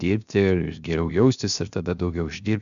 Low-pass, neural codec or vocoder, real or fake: 7.2 kHz; codec, 16 kHz, 0.7 kbps, FocalCodec; fake